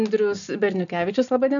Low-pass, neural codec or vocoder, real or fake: 7.2 kHz; none; real